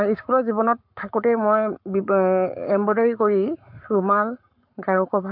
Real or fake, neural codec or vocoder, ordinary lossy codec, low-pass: fake; codec, 44.1 kHz, 7.8 kbps, Pupu-Codec; MP3, 48 kbps; 5.4 kHz